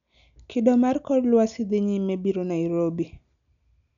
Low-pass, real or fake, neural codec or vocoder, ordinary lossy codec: 7.2 kHz; real; none; none